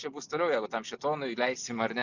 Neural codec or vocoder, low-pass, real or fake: none; 7.2 kHz; real